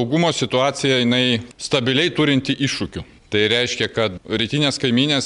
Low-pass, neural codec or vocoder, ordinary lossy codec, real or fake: 10.8 kHz; none; MP3, 96 kbps; real